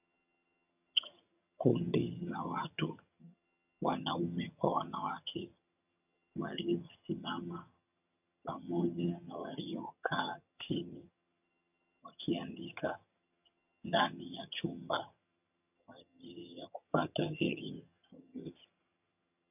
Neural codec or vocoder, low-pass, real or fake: vocoder, 22.05 kHz, 80 mel bands, HiFi-GAN; 3.6 kHz; fake